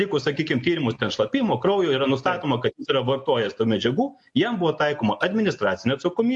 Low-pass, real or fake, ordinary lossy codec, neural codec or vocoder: 10.8 kHz; real; MP3, 48 kbps; none